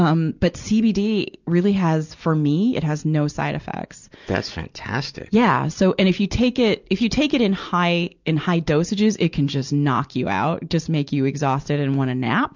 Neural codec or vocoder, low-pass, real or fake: none; 7.2 kHz; real